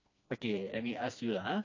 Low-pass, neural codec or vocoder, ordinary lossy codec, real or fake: 7.2 kHz; codec, 16 kHz, 2 kbps, FreqCodec, smaller model; AAC, 48 kbps; fake